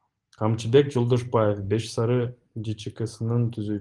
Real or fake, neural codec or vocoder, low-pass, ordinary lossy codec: real; none; 10.8 kHz; Opus, 24 kbps